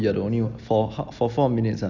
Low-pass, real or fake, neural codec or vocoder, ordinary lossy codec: 7.2 kHz; real; none; none